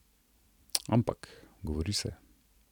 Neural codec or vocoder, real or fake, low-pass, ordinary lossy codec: vocoder, 44.1 kHz, 128 mel bands every 256 samples, BigVGAN v2; fake; 19.8 kHz; none